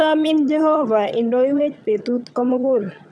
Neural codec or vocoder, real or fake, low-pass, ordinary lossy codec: vocoder, 22.05 kHz, 80 mel bands, HiFi-GAN; fake; none; none